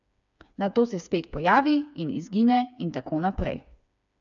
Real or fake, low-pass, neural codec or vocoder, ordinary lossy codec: fake; 7.2 kHz; codec, 16 kHz, 4 kbps, FreqCodec, smaller model; none